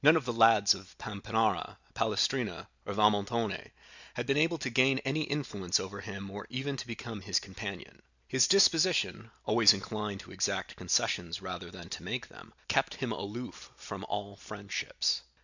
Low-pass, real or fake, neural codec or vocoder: 7.2 kHz; real; none